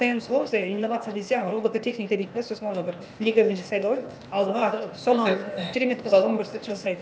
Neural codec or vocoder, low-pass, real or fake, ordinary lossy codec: codec, 16 kHz, 0.8 kbps, ZipCodec; none; fake; none